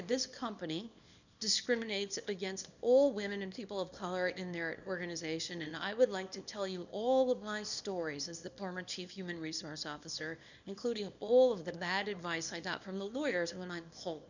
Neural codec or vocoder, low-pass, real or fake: codec, 24 kHz, 0.9 kbps, WavTokenizer, small release; 7.2 kHz; fake